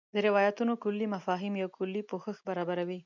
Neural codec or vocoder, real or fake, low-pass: none; real; 7.2 kHz